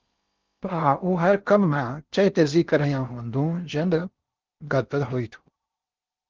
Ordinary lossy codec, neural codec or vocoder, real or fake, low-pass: Opus, 32 kbps; codec, 16 kHz in and 24 kHz out, 0.6 kbps, FocalCodec, streaming, 4096 codes; fake; 7.2 kHz